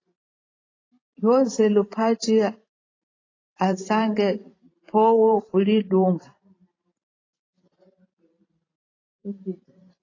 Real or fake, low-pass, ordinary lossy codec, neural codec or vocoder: fake; 7.2 kHz; AAC, 32 kbps; vocoder, 44.1 kHz, 128 mel bands every 512 samples, BigVGAN v2